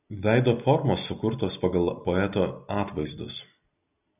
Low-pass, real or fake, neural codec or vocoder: 3.6 kHz; real; none